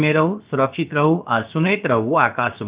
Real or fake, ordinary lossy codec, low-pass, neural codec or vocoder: fake; Opus, 32 kbps; 3.6 kHz; codec, 16 kHz, about 1 kbps, DyCAST, with the encoder's durations